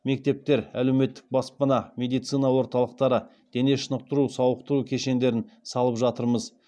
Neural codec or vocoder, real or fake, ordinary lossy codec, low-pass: none; real; none; none